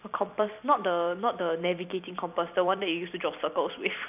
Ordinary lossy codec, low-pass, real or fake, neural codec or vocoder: none; 3.6 kHz; fake; vocoder, 44.1 kHz, 128 mel bands every 256 samples, BigVGAN v2